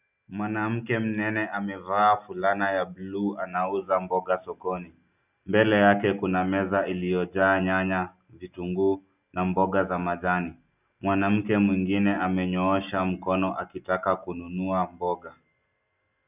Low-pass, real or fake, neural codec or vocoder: 3.6 kHz; real; none